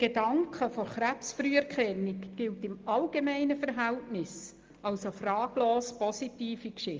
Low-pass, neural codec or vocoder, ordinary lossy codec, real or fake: 7.2 kHz; none; Opus, 32 kbps; real